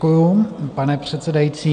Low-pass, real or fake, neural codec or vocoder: 9.9 kHz; real; none